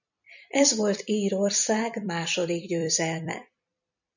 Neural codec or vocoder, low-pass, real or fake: vocoder, 44.1 kHz, 128 mel bands every 256 samples, BigVGAN v2; 7.2 kHz; fake